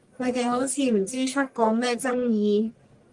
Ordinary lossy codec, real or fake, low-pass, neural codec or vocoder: Opus, 24 kbps; fake; 10.8 kHz; codec, 44.1 kHz, 1.7 kbps, Pupu-Codec